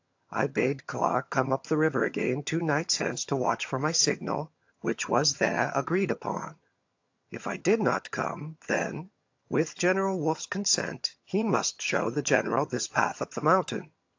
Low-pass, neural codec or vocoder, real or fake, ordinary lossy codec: 7.2 kHz; vocoder, 22.05 kHz, 80 mel bands, HiFi-GAN; fake; AAC, 48 kbps